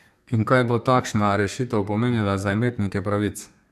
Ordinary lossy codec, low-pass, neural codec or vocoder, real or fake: none; 14.4 kHz; codec, 32 kHz, 1.9 kbps, SNAC; fake